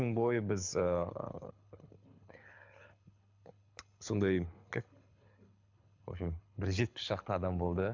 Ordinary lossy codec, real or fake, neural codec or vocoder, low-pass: MP3, 64 kbps; fake; codec, 24 kHz, 6 kbps, HILCodec; 7.2 kHz